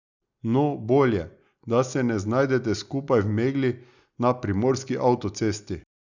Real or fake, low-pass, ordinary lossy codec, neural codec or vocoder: real; 7.2 kHz; none; none